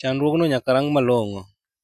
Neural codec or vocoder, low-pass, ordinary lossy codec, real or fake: none; 14.4 kHz; none; real